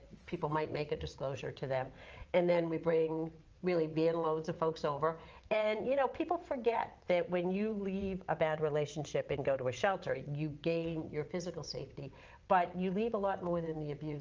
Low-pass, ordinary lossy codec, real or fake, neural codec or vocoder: 7.2 kHz; Opus, 24 kbps; fake; vocoder, 22.05 kHz, 80 mel bands, WaveNeXt